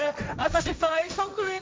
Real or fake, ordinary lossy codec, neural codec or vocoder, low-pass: fake; none; codec, 16 kHz, 1.1 kbps, Voila-Tokenizer; none